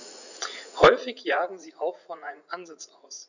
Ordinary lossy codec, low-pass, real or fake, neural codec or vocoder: none; 7.2 kHz; fake; vocoder, 44.1 kHz, 80 mel bands, Vocos